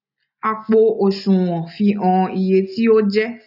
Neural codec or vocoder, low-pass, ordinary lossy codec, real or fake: none; 5.4 kHz; none; real